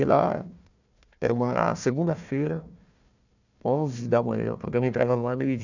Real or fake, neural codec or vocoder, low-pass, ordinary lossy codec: fake; codec, 16 kHz, 1 kbps, FunCodec, trained on Chinese and English, 50 frames a second; 7.2 kHz; none